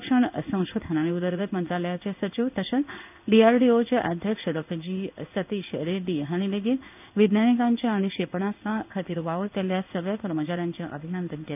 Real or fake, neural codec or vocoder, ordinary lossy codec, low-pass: fake; codec, 16 kHz in and 24 kHz out, 1 kbps, XY-Tokenizer; none; 3.6 kHz